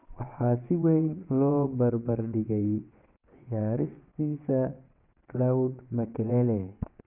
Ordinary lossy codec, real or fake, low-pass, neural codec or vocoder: none; fake; 3.6 kHz; vocoder, 22.05 kHz, 80 mel bands, WaveNeXt